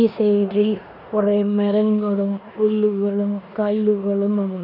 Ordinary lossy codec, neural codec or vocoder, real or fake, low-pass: none; codec, 16 kHz in and 24 kHz out, 0.9 kbps, LongCat-Audio-Codec, four codebook decoder; fake; 5.4 kHz